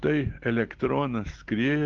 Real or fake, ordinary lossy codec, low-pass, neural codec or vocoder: real; Opus, 16 kbps; 7.2 kHz; none